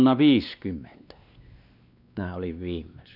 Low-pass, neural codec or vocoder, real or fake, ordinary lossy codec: 5.4 kHz; codec, 16 kHz, 2 kbps, X-Codec, WavLM features, trained on Multilingual LibriSpeech; fake; none